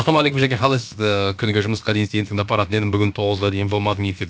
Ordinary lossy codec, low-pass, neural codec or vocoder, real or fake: none; none; codec, 16 kHz, about 1 kbps, DyCAST, with the encoder's durations; fake